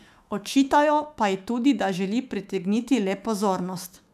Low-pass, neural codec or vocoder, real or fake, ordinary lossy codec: 14.4 kHz; autoencoder, 48 kHz, 128 numbers a frame, DAC-VAE, trained on Japanese speech; fake; none